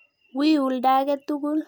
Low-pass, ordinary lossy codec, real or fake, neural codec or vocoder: none; none; real; none